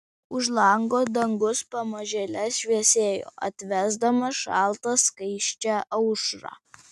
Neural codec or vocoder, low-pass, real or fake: none; 14.4 kHz; real